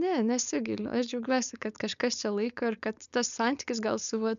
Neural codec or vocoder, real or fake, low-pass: codec, 16 kHz, 4.8 kbps, FACodec; fake; 7.2 kHz